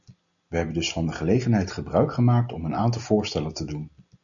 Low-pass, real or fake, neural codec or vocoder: 7.2 kHz; real; none